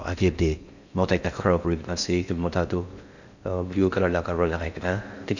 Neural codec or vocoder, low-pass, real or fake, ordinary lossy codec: codec, 16 kHz in and 24 kHz out, 0.6 kbps, FocalCodec, streaming, 4096 codes; 7.2 kHz; fake; none